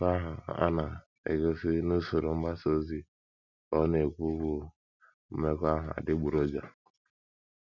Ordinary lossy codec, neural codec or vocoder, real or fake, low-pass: none; none; real; 7.2 kHz